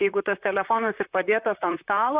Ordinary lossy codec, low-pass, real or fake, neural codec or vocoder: Opus, 64 kbps; 3.6 kHz; fake; vocoder, 44.1 kHz, 128 mel bands, Pupu-Vocoder